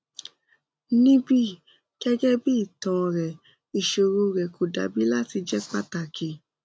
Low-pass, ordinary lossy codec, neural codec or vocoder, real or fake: none; none; none; real